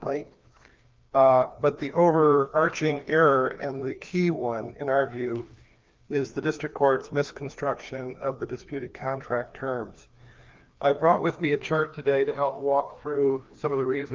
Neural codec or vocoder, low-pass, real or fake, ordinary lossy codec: codec, 16 kHz, 2 kbps, FreqCodec, larger model; 7.2 kHz; fake; Opus, 32 kbps